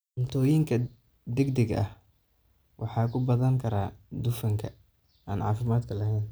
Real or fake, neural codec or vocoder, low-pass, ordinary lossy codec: real; none; none; none